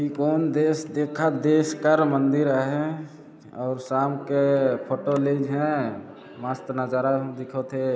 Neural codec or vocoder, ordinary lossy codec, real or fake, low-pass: none; none; real; none